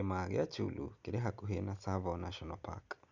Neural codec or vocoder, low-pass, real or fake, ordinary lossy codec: none; 7.2 kHz; real; none